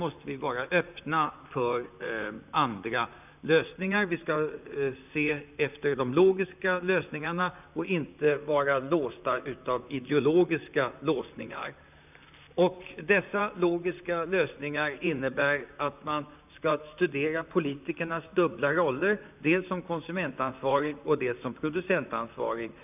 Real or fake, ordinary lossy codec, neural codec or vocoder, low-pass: fake; none; vocoder, 44.1 kHz, 128 mel bands, Pupu-Vocoder; 3.6 kHz